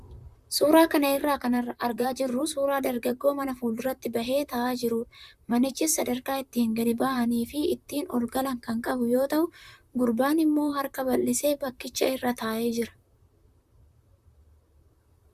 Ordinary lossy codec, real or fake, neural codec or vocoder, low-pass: Opus, 64 kbps; fake; vocoder, 44.1 kHz, 128 mel bands, Pupu-Vocoder; 14.4 kHz